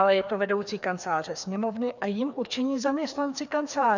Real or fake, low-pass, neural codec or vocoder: fake; 7.2 kHz; codec, 16 kHz, 2 kbps, FreqCodec, larger model